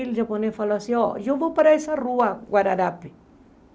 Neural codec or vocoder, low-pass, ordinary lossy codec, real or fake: none; none; none; real